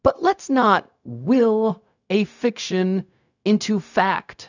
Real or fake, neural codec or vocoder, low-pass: fake; codec, 16 kHz, 0.4 kbps, LongCat-Audio-Codec; 7.2 kHz